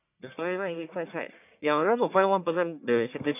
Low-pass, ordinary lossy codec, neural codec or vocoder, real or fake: 3.6 kHz; none; codec, 44.1 kHz, 1.7 kbps, Pupu-Codec; fake